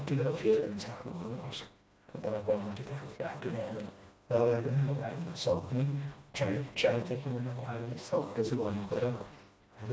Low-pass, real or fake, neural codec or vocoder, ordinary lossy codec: none; fake; codec, 16 kHz, 1 kbps, FreqCodec, smaller model; none